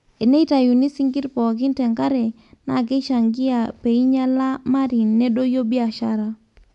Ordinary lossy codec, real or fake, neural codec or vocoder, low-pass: none; real; none; 10.8 kHz